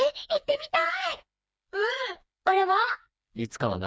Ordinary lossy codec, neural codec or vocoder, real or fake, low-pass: none; codec, 16 kHz, 2 kbps, FreqCodec, smaller model; fake; none